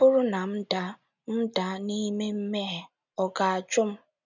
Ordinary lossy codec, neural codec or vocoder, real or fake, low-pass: none; none; real; 7.2 kHz